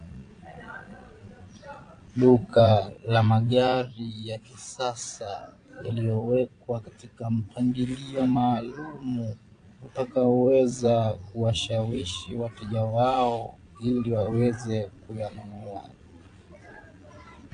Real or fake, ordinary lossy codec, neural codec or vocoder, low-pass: fake; AAC, 48 kbps; vocoder, 22.05 kHz, 80 mel bands, Vocos; 9.9 kHz